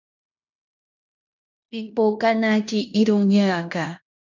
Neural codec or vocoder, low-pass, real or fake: codec, 16 kHz in and 24 kHz out, 0.9 kbps, LongCat-Audio-Codec, fine tuned four codebook decoder; 7.2 kHz; fake